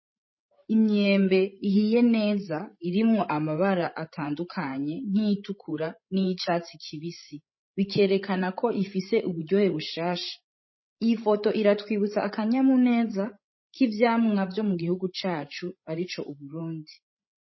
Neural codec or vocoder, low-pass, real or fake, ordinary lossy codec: codec, 16 kHz, 16 kbps, FreqCodec, larger model; 7.2 kHz; fake; MP3, 24 kbps